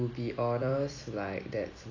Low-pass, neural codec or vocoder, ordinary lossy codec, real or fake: 7.2 kHz; vocoder, 44.1 kHz, 128 mel bands every 256 samples, BigVGAN v2; AAC, 32 kbps; fake